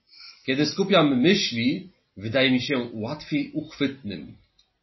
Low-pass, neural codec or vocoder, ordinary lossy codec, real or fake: 7.2 kHz; none; MP3, 24 kbps; real